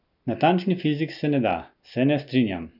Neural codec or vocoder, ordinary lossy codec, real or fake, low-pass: none; none; real; 5.4 kHz